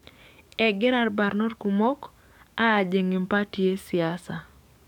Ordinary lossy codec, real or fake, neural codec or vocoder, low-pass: none; fake; codec, 44.1 kHz, 7.8 kbps, DAC; 19.8 kHz